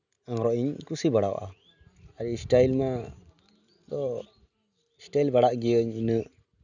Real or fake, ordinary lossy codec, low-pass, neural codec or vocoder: real; none; 7.2 kHz; none